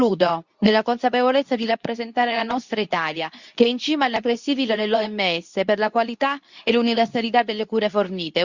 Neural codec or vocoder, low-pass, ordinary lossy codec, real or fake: codec, 24 kHz, 0.9 kbps, WavTokenizer, medium speech release version 2; 7.2 kHz; Opus, 64 kbps; fake